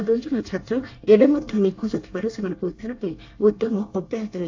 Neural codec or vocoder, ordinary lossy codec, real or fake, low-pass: codec, 24 kHz, 1 kbps, SNAC; AAC, 48 kbps; fake; 7.2 kHz